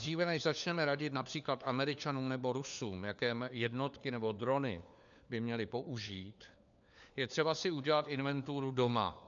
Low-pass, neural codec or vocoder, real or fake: 7.2 kHz; codec, 16 kHz, 4 kbps, FunCodec, trained on LibriTTS, 50 frames a second; fake